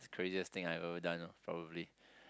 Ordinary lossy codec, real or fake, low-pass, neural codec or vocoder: none; real; none; none